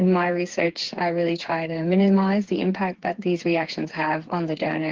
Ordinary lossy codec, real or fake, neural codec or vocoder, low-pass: Opus, 16 kbps; fake; codec, 16 kHz, 4 kbps, FreqCodec, smaller model; 7.2 kHz